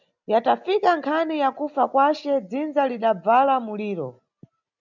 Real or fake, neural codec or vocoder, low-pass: real; none; 7.2 kHz